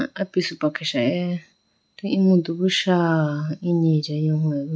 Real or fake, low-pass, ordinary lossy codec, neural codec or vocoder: real; none; none; none